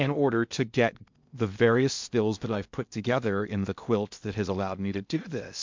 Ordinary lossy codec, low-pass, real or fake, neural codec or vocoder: MP3, 64 kbps; 7.2 kHz; fake; codec, 16 kHz in and 24 kHz out, 0.6 kbps, FocalCodec, streaming, 2048 codes